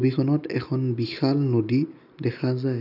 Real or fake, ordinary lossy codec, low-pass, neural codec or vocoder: real; none; 5.4 kHz; none